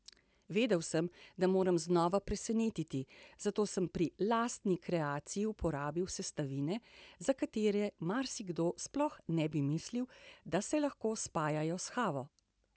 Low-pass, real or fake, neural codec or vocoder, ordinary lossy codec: none; real; none; none